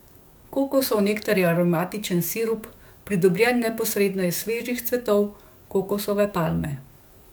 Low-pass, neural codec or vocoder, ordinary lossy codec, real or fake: none; codec, 44.1 kHz, 7.8 kbps, DAC; none; fake